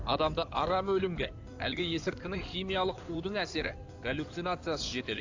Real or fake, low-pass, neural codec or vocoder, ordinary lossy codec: fake; 7.2 kHz; codec, 16 kHz in and 24 kHz out, 2.2 kbps, FireRedTTS-2 codec; AAC, 48 kbps